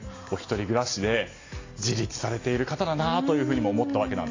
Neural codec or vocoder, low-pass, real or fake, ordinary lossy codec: none; 7.2 kHz; real; AAC, 32 kbps